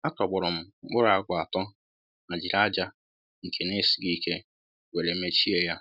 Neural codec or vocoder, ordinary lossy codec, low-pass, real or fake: none; none; 5.4 kHz; real